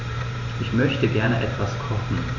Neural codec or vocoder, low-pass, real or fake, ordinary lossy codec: vocoder, 44.1 kHz, 128 mel bands every 512 samples, BigVGAN v2; 7.2 kHz; fake; none